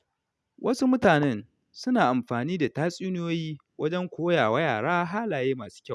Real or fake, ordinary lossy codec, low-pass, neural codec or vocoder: real; none; none; none